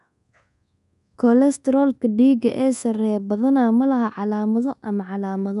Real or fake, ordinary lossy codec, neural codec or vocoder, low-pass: fake; none; codec, 24 kHz, 1.2 kbps, DualCodec; 10.8 kHz